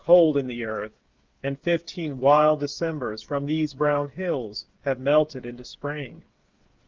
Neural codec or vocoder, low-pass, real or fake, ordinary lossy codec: codec, 16 kHz, 8 kbps, FreqCodec, smaller model; 7.2 kHz; fake; Opus, 24 kbps